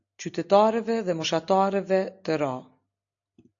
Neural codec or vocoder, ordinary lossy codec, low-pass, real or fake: none; AAC, 48 kbps; 7.2 kHz; real